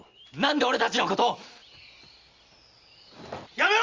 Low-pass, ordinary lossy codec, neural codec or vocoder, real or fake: 7.2 kHz; Opus, 32 kbps; none; real